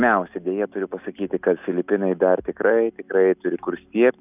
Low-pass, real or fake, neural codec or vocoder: 3.6 kHz; real; none